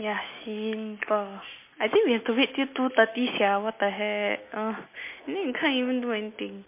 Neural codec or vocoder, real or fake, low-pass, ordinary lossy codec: none; real; 3.6 kHz; MP3, 24 kbps